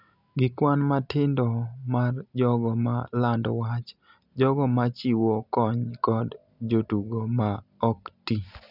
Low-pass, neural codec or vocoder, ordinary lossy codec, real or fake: 5.4 kHz; none; none; real